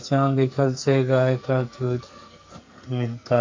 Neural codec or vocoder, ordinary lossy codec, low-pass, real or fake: codec, 44.1 kHz, 2.6 kbps, SNAC; MP3, 48 kbps; 7.2 kHz; fake